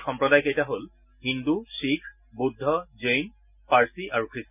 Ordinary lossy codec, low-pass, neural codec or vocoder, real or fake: none; 3.6 kHz; none; real